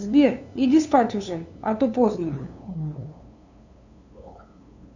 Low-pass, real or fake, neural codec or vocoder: 7.2 kHz; fake; codec, 16 kHz, 2 kbps, FunCodec, trained on LibriTTS, 25 frames a second